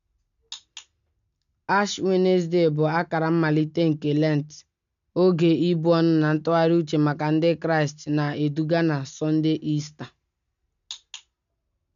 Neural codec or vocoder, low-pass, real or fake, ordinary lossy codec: none; 7.2 kHz; real; none